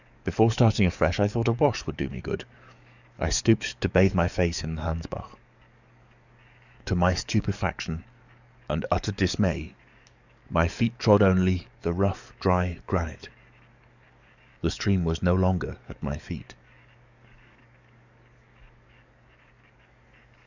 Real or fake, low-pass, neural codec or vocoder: fake; 7.2 kHz; codec, 44.1 kHz, 7.8 kbps, DAC